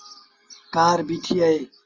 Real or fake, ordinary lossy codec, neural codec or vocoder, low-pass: real; Opus, 32 kbps; none; 7.2 kHz